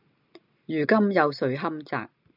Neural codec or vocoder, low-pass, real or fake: none; 5.4 kHz; real